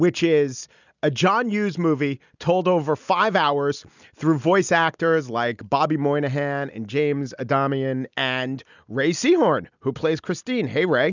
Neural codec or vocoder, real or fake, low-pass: none; real; 7.2 kHz